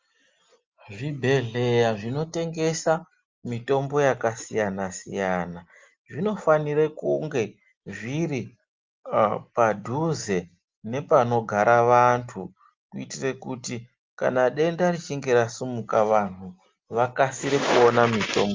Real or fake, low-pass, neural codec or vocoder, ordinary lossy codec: real; 7.2 kHz; none; Opus, 32 kbps